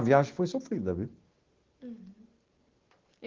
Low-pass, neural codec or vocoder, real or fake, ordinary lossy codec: 7.2 kHz; none; real; Opus, 16 kbps